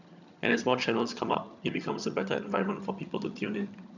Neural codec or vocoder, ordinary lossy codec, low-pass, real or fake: vocoder, 22.05 kHz, 80 mel bands, HiFi-GAN; none; 7.2 kHz; fake